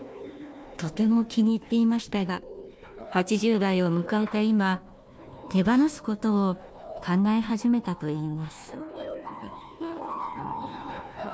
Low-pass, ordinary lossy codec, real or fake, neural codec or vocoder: none; none; fake; codec, 16 kHz, 1 kbps, FunCodec, trained on Chinese and English, 50 frames a second